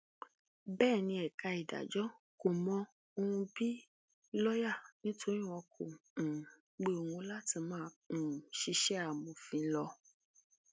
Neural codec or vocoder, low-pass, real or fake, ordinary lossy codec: none; none; real; none